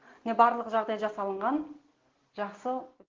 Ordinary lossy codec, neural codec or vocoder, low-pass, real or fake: Opus, 16 kbps; none; 7.2 kHz; real